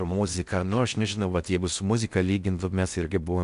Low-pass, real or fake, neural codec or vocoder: 10.8 kHz; fake; codec, 16 kHz in and 24 kHz out, 0.6 kbps, FocalCodec, streaming, 4096 codes